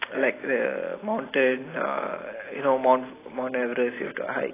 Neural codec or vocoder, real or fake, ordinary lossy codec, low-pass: none; real; AAC, 16 kbps; 3.6 kHz